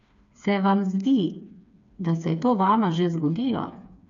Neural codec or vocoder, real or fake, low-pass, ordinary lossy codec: codec, 16 kHz, 4 kbps, FreqCodec, smaller model; fake; 7.2 kHz; MP3, 96 kbps